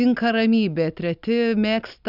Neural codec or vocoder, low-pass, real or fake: none; 5.4 kHz; real